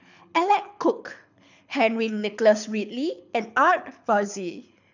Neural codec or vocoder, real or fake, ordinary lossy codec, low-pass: codec, 24 kHz, 6 kbps, HILCodec; fake; none; 7.2 kHz